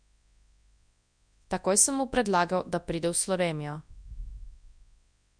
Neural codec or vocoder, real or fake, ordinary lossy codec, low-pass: codec, 24 kHz, 0.9 kbps, WavTokenizer, large speech release; fake; none; 9.9 kHz